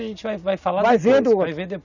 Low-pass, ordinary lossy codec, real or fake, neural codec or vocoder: 7.2 kHz; none; real; none